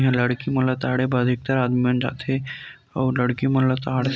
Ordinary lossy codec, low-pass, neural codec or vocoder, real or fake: none; none; none; real